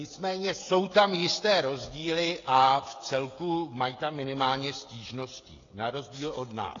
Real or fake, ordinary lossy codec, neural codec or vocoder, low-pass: fake; AAC, 32 kbps; codec, 16 kHz, 16 kbps, FreqCodec, smaller model; 7.2 kHz